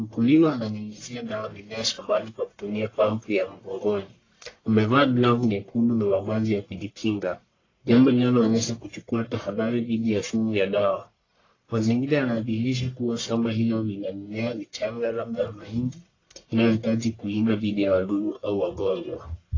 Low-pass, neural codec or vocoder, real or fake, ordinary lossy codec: 7.2 kHz; codec, 44.1 kHz, 1.7 kbps, Pupu-Codec; fake; AAC, 32 kbps